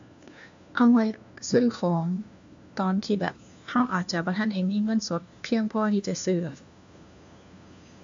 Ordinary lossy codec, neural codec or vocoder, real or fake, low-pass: AAC, 64 kbps; codec, 16 kHz, 1 kbps, FunCodec, trained on LibriTTS, 50 frames a second; fake; 7.2 kHz